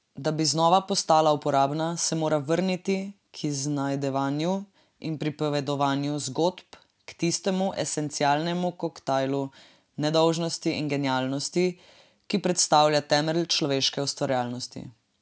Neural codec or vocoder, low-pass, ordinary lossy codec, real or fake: none; none; none; real